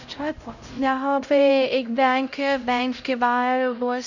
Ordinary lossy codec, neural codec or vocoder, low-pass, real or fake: none; codec, 16 kHz, 0.5 kbps, X-Codec, HuBERT features, trained on LibriSpeech; 7.2 kHz; fake